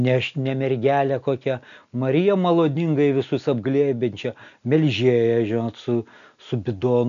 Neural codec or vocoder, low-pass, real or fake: none; 7.2 kHz; real